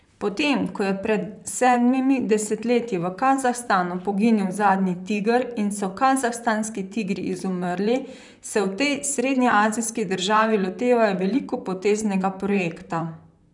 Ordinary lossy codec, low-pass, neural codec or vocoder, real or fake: none; 10.8 kHz; vocoder, 44.1 kHz, 128 mel bands, Pupu-Vocoder; fake